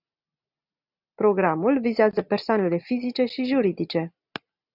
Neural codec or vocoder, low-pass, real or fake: none; 5.4 kHz; real